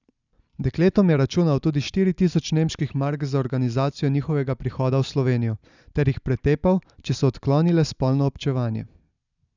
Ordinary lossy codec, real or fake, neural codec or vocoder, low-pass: none; real; none; 7.2 kHz